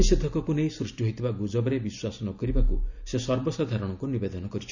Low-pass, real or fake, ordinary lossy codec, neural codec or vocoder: 7.2 kHz; real; none; none